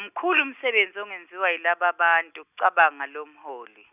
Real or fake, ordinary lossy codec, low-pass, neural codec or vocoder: real; none; 3.6 kHz; none